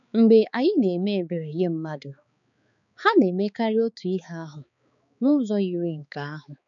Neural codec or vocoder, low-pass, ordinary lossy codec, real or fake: codec, 16 kHz, 4 kbps, X-Codec, HuBERT features, trained on balanced general audio; 7.2 kHz; none; fake